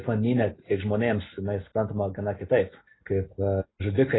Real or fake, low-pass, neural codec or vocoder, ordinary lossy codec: real; 7.2 kHz; none; AAC, 16 kbps